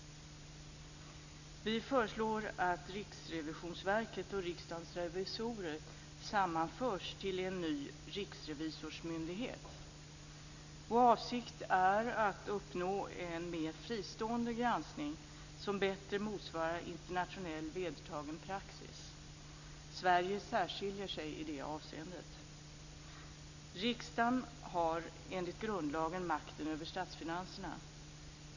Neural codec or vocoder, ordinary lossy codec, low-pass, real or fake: none; none; 7.2 kHz; real